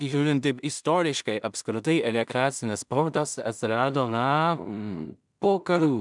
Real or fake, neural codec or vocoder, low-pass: fake; codec, 16 kHz in and 24 kHz out, 0.4 kbps, LongCat-Audio-Codec, two codebook decoder; 10.8 kHz